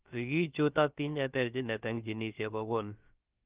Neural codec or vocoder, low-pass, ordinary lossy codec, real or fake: codec, 16 kHz, about 1 kbps, DyCAST, with the encoder's durations; 3.6 kHz; Opus, 32 kbps; fake